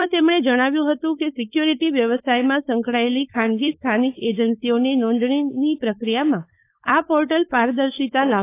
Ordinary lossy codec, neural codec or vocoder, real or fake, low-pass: AAC, 24 kbps; codec, 16 kHz, 4.8 kbps, FACodec; fake; 3.6 kHz